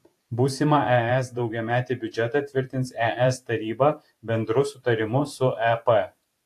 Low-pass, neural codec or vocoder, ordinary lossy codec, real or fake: 14.4 kHz; vocoder, 44.1 kHz, 128 mel bands every 512 samples, BigVGAN v2; AAC, 64 kbps; fake